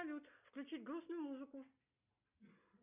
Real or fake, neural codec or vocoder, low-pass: real; none; 3.6 kHz